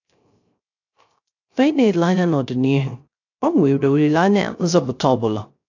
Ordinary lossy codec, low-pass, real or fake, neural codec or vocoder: AAC, 48 kbps; 7.2 kHz; fake; codec, 16 kHz, 0.3 kbps, FocalCodec